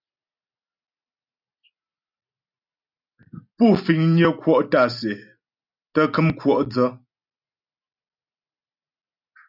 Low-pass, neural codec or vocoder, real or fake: 5.4 kHz; none; real